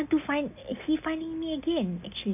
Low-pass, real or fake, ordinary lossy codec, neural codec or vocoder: 3.6 kHz; real; none; none